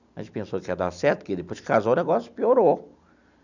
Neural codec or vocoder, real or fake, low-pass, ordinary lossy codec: none; real; 7.2 kHz; none